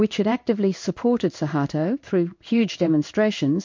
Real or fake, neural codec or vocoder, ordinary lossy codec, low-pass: fake; codec, 16 kHz in and 24 kHz out, 1 kbps, XY-Tokenizer; MP3, 48 kbps; 7.2 kHz